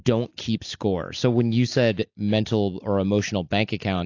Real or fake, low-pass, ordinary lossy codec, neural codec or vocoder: real; 7.2 kHz; AAC, 48 kbps; none